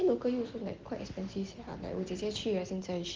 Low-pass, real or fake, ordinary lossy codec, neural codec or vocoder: 7.2 kHz; real; Opus, 16 kbps; none